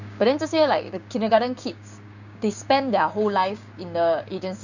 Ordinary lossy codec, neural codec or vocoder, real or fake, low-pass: none; codec, 16 kHz, 6 kbps, DAC; fake; 7.2 kHz